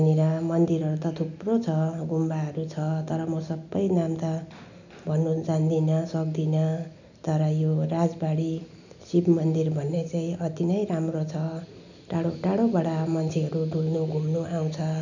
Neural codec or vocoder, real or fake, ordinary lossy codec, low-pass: none; real; none; 7.2 kHz